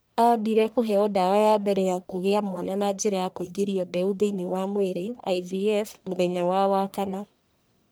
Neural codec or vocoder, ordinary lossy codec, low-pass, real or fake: codec, 44.1 kHz, 1.7 kbps, Pupu-Codec; none; none; fake